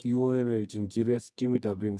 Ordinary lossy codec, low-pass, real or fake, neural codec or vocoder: none; none; fake; codec, 24 kHz, 0.9 kbps, WavTokenizer, medium music audio release